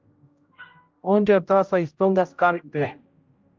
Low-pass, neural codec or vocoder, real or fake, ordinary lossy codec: 7.2 kHz; codec, 16 kHz, 0.5 kbps, X-Codec, HuBERT features, trained on general audio; fake; Opus, 32 kbps